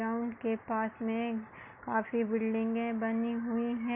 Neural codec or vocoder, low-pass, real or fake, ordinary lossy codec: none; 3.6 kHz; real; MP3, 32 kbps